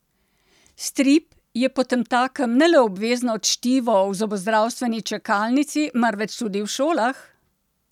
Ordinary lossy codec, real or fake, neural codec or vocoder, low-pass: none; real; none; 19.8 kHz